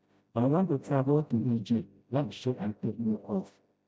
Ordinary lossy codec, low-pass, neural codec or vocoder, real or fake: none; none; codec, 16 kHz, 0.5 kbps, FreqCodec, smaller model; fake